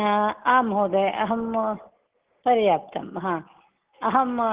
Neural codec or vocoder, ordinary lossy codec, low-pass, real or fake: none; Opus, 16 kbps; 3.6 kHz; real